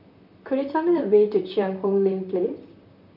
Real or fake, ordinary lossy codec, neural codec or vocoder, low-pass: fake; none; vocoder, 44.1 kHz, 128 mel bands, Pupu-Vocoder; 5.4 kHz